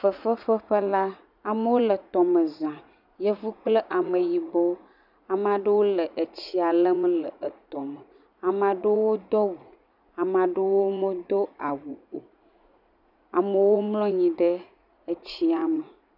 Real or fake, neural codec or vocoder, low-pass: fake; vocoder, 44.1 kHz, 80 mel bands, Vocos; 5.4 kHz